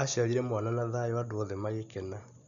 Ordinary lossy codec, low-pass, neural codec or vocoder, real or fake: none; 7.2 kHz; none; real